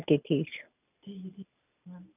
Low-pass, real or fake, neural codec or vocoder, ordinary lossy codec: 3.6 kHz; real; none; none